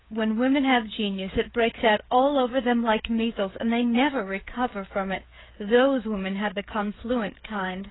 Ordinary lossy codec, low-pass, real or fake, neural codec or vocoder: AAC, 16 kbps; 7.2 kHz; fake; codec, 16 kHz, 8 kbps, FreqCodec, smaller model